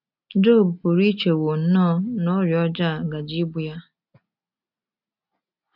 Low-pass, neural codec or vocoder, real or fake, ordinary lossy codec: 5.4 kHz; none; real; none